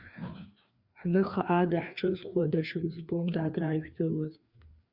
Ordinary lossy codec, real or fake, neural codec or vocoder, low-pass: Opus, 64 kbps; fake; codec, 16 kHz, 2 kbps, FreqCodec, larger model; 5.4 kHz